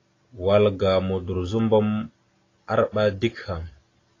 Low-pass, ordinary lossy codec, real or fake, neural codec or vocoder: 7.2 kHz; AAC, 32 kbps; real; none